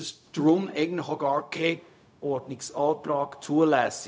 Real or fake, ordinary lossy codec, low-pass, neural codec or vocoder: fake; none; none; codec, 16 kHz, 0.4 kbps, LongCat-Audio-Codec